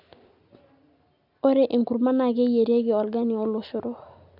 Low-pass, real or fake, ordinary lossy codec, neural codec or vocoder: 5.4 kHz; real; none; none